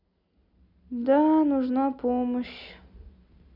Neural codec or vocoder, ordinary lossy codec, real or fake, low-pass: none; none; real; 5.4 kHz